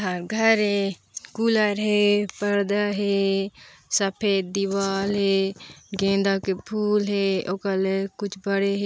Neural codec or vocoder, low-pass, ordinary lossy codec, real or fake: none; none; none; real